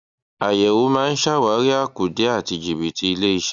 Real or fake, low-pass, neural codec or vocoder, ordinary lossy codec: real; 7.2 kHz; none; none